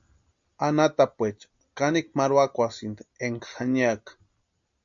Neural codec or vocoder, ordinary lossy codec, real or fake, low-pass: none; MP3, 48 kbps; real; 7.2 kHz